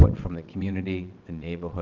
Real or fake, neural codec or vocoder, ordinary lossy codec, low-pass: fake; vocoder, 22.05 kHz, 80 mel bands, WaveNeXt; Opus, 24 kbps; 7.2 kHz